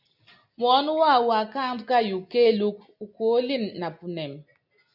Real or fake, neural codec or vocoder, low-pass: real; none; 5.4 kHz